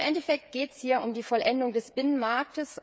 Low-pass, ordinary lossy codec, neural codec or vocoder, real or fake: none; none; codec, 16 kHz, 8 kbps, FreqCodec, smaller model; fake